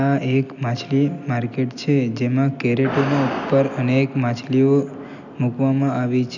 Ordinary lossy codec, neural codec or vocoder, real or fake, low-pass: none; none; real; 7.2 kHz